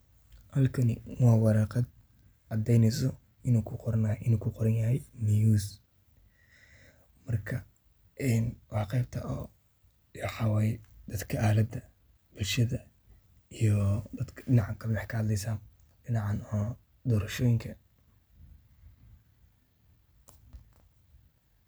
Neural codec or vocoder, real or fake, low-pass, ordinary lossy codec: none; real; none; none